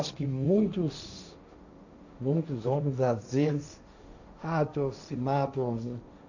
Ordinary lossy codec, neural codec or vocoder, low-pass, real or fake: none; codec, 16 kHz, 1.1 kbps, Voila-Tokenizer; 7.2 kHz; fake